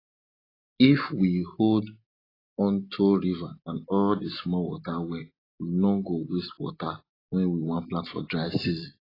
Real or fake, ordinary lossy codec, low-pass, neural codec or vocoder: real; AAC, 24 kbps; 5.4 kHz; none